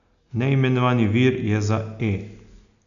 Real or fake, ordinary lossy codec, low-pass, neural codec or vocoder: real; none; 7.2 kHz; none